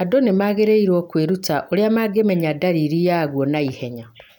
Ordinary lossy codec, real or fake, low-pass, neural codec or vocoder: none; real; 19.8 kHz; none